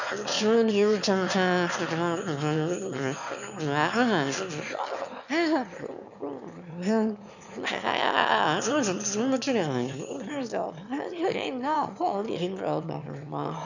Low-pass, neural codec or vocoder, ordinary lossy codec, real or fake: 7.2 kHz; autoencoder, 22.05 kHz, a latent of 192 numbers a frame, VITS, trained on one speaker; none; fake